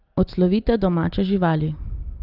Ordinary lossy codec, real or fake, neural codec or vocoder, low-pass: Opus, 32 kbps; real; none; 5.4 kHz